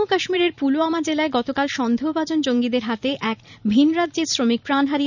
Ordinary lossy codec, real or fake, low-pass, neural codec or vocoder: none; fake; 7.2 kHz; vocoder, 44.1 kHz, 128 mel bands every 512 samples, BigVGAN v2